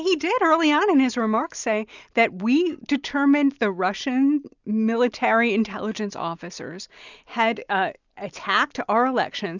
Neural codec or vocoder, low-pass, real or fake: none; 7.2 kHz; real